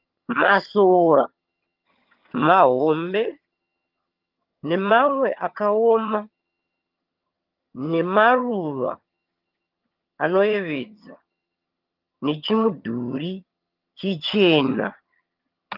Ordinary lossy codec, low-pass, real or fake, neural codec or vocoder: Opus, 24 kbps; 5.4 kHz; fake; vocoder, 22.05 kHz, 80 mel bands, HiFi-GAN